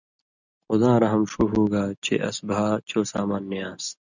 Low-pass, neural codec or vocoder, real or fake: 7.2 kHz; none; real